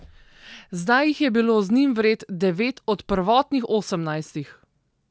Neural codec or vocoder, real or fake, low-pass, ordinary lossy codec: none; real; none; none